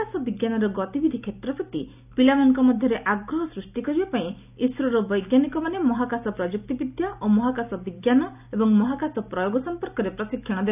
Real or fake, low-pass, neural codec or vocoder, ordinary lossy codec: real; 3.6 kHz; none; none